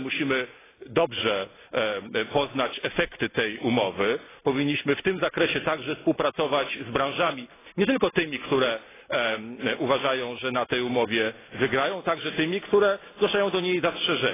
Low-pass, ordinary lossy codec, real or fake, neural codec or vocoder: 3.6 kHz; AAC, 16 kbps; real; none